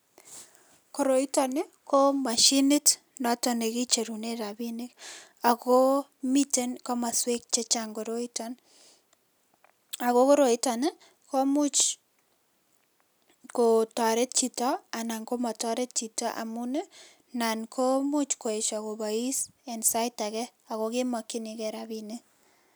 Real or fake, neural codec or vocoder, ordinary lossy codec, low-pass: real; none; none; none